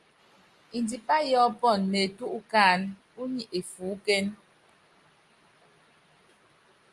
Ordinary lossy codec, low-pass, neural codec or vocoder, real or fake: Opus, 32 kbps; 10.8 kHz; none; real